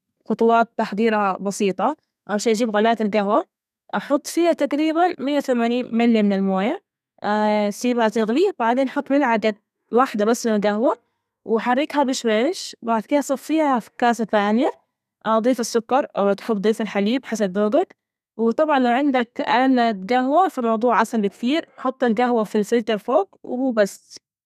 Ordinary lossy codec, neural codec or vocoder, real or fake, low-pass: none; codec, 32 kHz, 1.9 kbps, SNAC; fake; 14.4 kHz